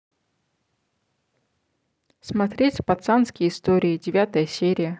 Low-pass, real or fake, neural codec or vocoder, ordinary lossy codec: none; real; none; none